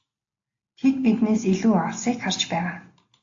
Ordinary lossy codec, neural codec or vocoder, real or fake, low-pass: AAC, 48 kbps; none; real; 7.2 kHz